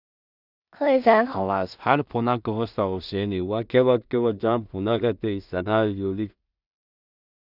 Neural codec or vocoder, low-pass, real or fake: codec, 16 kHz in and 24 kHz out, 0.4 kbps, LongCat-Audio-Codec, two codebook decoder; 5.4 kHz; fake